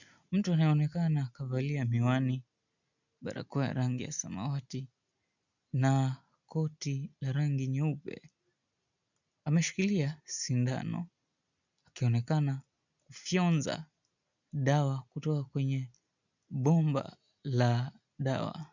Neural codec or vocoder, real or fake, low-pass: none; real; 7.2 kHz